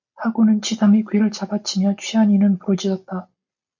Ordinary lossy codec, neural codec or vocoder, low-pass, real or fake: MP3, 48 kbps; none; 7.2 kHz; real